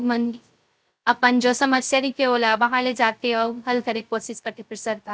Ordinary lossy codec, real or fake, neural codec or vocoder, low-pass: none; fake; codec, 16 kHz, 0.3 kbps, FocalCodec; none